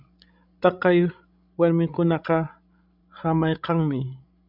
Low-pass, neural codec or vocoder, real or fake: 5.4 kHz; codec, 16 kHz, 16 kbps, FreqCodec, larger model; fake